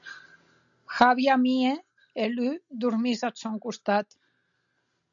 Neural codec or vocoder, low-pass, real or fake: none; 7.2 kHz; real